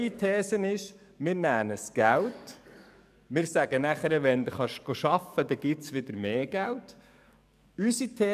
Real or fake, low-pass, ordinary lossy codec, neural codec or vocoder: fake; 14.4 kHz; none; codec, 44.1 kHz, 7.8 kbps, DAC